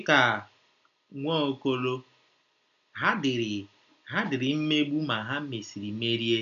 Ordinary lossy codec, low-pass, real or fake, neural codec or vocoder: none; 7.2 kHz; real; none